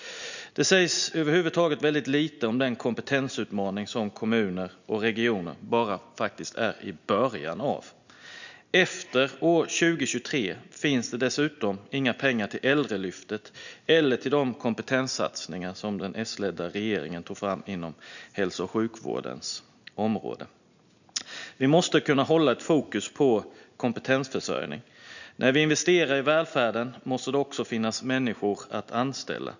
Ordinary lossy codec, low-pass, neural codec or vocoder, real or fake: none; 7.2 kHz; none; real